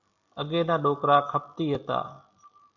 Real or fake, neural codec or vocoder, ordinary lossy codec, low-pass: real; none; MP3, 64 kbps; 7.2 kHz